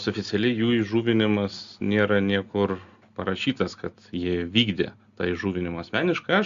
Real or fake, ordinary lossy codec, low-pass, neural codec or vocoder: real; Opus, 64 kbps; 7.2 kHz; none